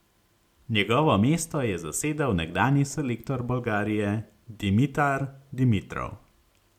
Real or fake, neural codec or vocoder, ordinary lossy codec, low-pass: real; none; MP3, 96 kbps; 19.8 kHz